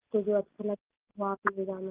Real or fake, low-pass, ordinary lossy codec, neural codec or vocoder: real; 3.6 kHz; Opus, 32 kbps; none